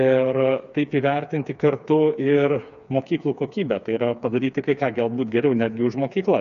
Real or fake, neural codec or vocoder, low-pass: fake; codec, 16 kHz, 4 kbps, FreqCodec, smaller model; 7.2 kHz